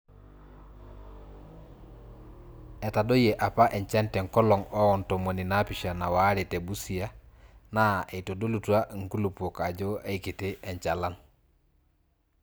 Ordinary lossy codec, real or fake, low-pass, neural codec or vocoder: none; real; none; none